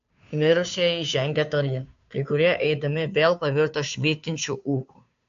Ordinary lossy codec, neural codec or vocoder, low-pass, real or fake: AAC, 64 kbps; codec, 16 kHz, 2 kbps, FunCodec, trained on Chinese and English, 25 frames a second; 7.2 kHz; fake